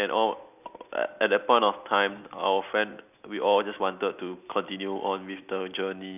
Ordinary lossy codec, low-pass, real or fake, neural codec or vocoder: none; 3.6 kHz; real; none